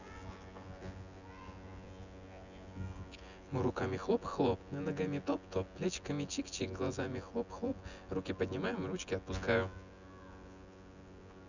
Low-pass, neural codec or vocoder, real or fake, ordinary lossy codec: 7.2 kHz; vocoder, 24 kHz, 100 mel bands, Vocos; fake; none